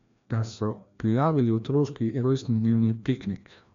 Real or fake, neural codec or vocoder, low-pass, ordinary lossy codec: fake; codec, 16 kHz, 1 kbps, FreqCodec, larger model; 7.2 kHz; MP3, 64 kbps